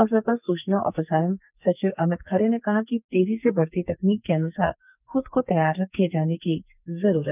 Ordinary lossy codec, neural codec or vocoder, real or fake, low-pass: none; codec, 44.1 kHz, 2.6 kbps, SNAC; fake; 3.6 kHz